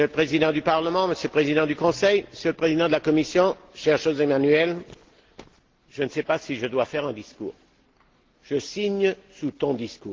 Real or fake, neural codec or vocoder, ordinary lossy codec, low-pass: real; none; Opus, 16 kbps; 7.2 kHz